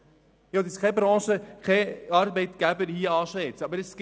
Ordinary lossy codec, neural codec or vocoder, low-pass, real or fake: none; none; none; real